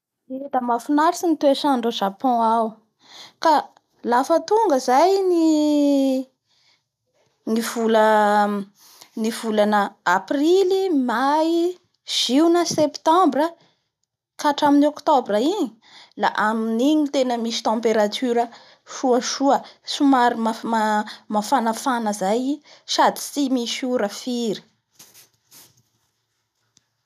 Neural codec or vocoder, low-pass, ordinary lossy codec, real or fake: none; 14.4 kHz; none; real